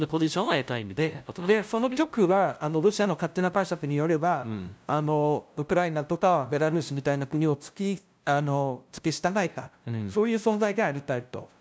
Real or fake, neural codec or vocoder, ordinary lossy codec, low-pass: fake; codec, 16 kHz, 0.5 kbps, FunCodec, trained on LibriTTS, 25 frames a second; none; none